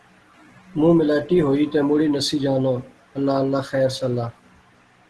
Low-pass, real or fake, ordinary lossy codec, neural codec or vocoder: 10.8 kHz; real; Opus, 16 kbps; none